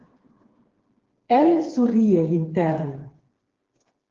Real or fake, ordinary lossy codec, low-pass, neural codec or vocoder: fake; Opus, 16 kbps; 7.2 kHz; codec, 16 kHz, 4 kbps, FreqCodec, smaller model